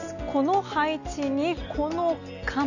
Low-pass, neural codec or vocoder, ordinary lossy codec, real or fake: 7.2 kHz; none; none; real